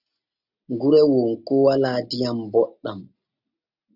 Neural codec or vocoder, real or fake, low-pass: none; real; 5.4 kHz